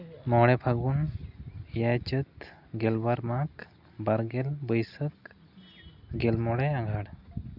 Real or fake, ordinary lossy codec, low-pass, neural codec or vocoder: real; Opus, 64 kbps; 5.4 kHz; none